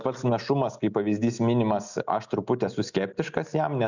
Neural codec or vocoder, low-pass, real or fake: none; 7.2 kHz; real